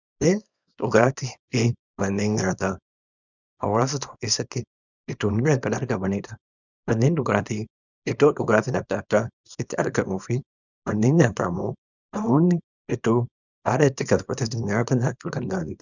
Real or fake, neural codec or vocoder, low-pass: fake; codec, 24 kHz, 0.9 kbps, WavTokenizer, small release; 7.2 kHz